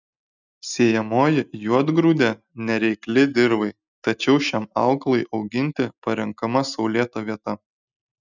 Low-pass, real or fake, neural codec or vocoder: 7.2 kHz; real; none